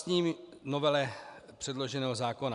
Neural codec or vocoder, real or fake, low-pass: none; real; 10.8 kHz